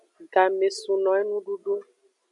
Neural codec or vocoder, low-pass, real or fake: none; 10.8 kHz; real